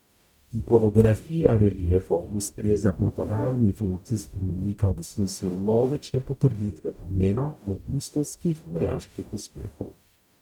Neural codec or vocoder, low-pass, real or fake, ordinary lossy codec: codec, 44.1 kHz, 0.9 kbps, DAC; 19.8 kHz; fake; none